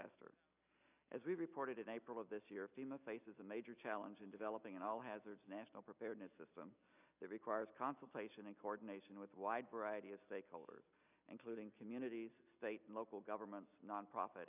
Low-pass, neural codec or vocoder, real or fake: 3.6 kHz; none; real